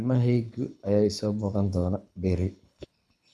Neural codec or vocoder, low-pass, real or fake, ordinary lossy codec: codec, 24 kHz, 6 kbps, HILCodec; none; fake; none